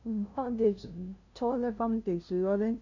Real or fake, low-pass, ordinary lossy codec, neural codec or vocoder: fake; 7.2 kHz; none; codec, 16 kHz, 0.5 kbps, FunCodec, trained on LibriTTS, 25 frames a second